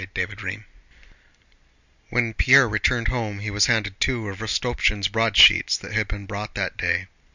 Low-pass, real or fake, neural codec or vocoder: 7.2 kHz; real; none